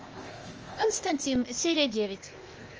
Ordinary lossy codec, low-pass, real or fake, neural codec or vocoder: Opus, 24 kbps; 7.2 kHz; fake; codec, 16 kHz, 0.8 kbps, ZipCodec